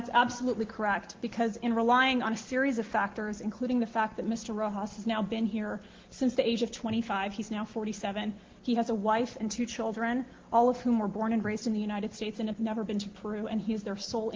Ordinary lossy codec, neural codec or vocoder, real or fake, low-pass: Opus, 16 kbps; none; real; 7.2 kHz